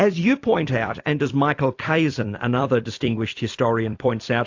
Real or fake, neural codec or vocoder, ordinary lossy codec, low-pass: fake; vocoder, 44.1 kHz, 128 mel bands every 256 samples, BigVGAN v2; AAC, 48 kbps; 7.2 kHz